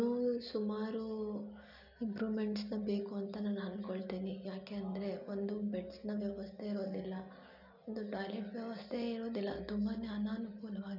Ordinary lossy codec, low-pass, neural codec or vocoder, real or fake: none; 5.4 kHz; none; real